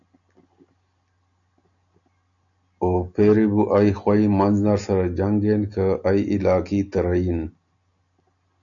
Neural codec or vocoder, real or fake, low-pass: none; real; 7.2 kHz